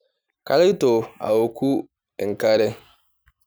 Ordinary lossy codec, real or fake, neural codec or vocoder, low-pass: none; real; none; none